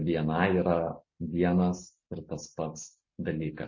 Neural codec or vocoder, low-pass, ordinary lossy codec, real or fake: none; 7.2 kHz; MP3, 32 kbps; real